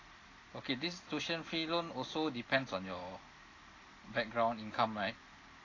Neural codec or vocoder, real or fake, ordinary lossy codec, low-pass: none; real; AAC, 32 kbps; 7.2 kHz